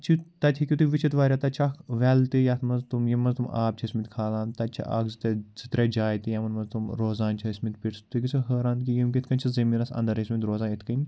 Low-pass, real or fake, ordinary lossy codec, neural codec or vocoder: none; real; none; none